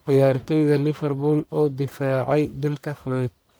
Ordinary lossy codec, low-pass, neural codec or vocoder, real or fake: none; none; codec, 44.1 kHz, 1.7 kbps, Pupu-Codec; fake